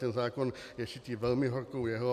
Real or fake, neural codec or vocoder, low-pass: real; none; 14.4 kHz